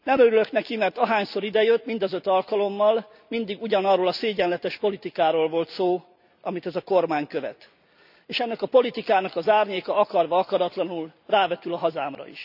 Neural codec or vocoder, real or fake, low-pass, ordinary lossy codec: none; real; 5.4 kHz; none